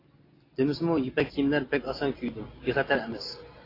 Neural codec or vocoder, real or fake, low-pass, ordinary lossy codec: none; real; 5.4 kHz; AAC, 24 kbps